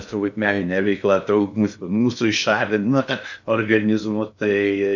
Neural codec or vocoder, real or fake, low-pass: codec, 16 kHz in and 24 kHz out, 0.6 kbps, FocalCodec, streaming, 2048 codes; fake; 7.2 kHz